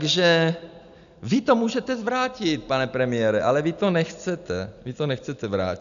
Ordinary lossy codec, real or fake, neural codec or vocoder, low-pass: MP3, 96 kbps; real; none; 7.2 kHz